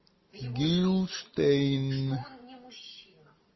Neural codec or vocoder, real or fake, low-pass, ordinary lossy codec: none; real; 7.2 kHz; MP3, 24 kbps